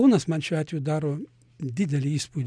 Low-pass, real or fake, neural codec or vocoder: 9.9 kHz; real; none